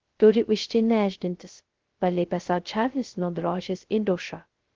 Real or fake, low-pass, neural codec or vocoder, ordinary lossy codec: fake; 7.2 kHz; codec, 16 kHz, 0.2 kbps, FocalCodec; Opus, 16 kbps